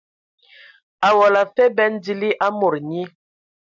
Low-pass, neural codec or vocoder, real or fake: 7.2 kHz; none; real